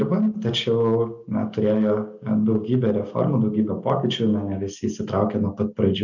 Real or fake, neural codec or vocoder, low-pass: real; none; 7.2 kHz